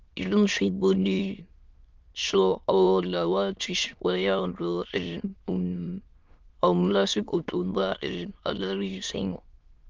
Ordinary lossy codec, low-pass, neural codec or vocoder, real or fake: Opus, 24 kbps; 7.2 kHz; autoencoder, 22.05 kHz, a latent of 192 numbers a frame, VITS, trained on many speakers; fake